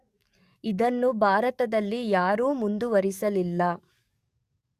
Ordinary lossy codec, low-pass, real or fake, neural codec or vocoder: Opus, 64 kbps; 14.4 kHz; fake; codec, 44.1 kHz, 7.8 kbps, DAC